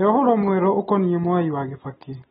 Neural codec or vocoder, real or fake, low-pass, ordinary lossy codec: none; real; 14.4 kHz; AAC, 16 kbps